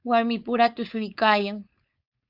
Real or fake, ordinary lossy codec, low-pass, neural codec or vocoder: fake; Opus, 64 kbps; 5.4 kHz; codec, 16 kHz, 4.8 kbps, FACodec